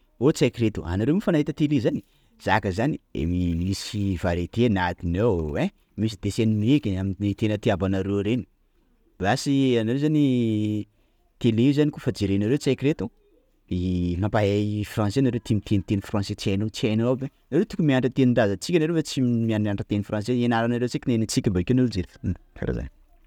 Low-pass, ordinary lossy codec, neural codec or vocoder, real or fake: 19.8 kHz; none; none; real